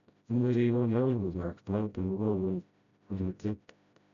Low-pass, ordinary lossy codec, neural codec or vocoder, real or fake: 7.2 kHz; none; codec, 16 kHz, 0.5 kbps, FreqCodec, smaller model; fake